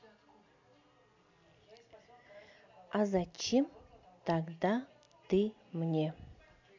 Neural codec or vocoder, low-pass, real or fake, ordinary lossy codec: none; 7.2 kHz; real; AAC, 48 kbps